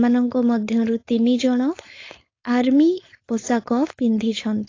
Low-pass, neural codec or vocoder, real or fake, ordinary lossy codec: 7.2 kHz; codec, 16 kHz, 4.8 kbps, FACodec; fake; AAC, 32 kbps